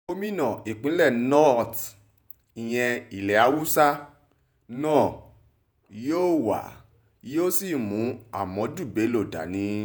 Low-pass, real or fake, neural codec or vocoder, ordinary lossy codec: none; fake; vocoder, 48 kHz, 128 mel bands, Vocos; none